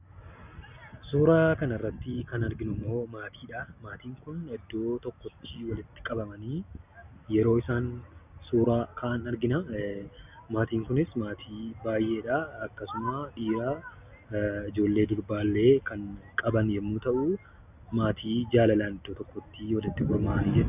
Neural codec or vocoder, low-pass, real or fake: none; 3.6 kHz; real